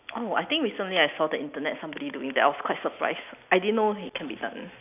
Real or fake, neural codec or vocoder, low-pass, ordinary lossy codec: real; none; 3.6 kHz; none